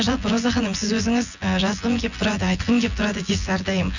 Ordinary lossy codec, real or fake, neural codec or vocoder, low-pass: none; fake; vocoder, 24 kHz, 100 mel bands, Vocos; 7.2 kHz